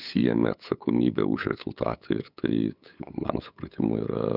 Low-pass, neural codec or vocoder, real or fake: 5.4 kHz; codec, 16 kHz, 8 kbps, FunCodec, trained on LibriTTS, 25 frames a second; fake